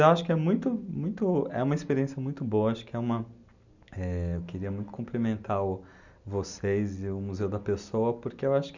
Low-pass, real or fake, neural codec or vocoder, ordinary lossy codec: 7.2 kHz; real; none; none